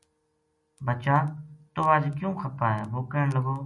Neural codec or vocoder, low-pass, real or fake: none; 10.8 kHz; real